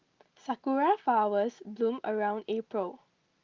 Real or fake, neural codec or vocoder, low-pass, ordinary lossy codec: real; none; 7.2 kHz; Opus, 32 kbps